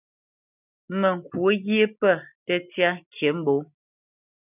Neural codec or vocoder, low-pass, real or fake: none; 3.6 kHz; real